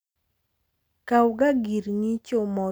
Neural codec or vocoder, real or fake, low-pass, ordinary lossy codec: none; real; none; none